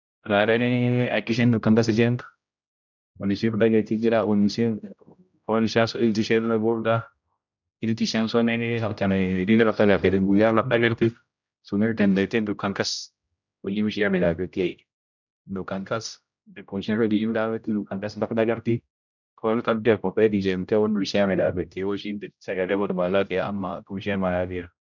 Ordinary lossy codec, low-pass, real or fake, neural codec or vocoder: none; 7.2 kHz; fake; codec, 16 kHz, 0.5 kbps, X-Codec, HuBERT features, trained on general audio